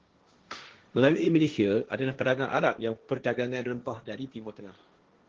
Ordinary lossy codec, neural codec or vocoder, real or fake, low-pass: Opus, 16 kbps; codec, 16 kHz, 1.1 kbps, Voila-Tokenizer; fake; 7.2 kHz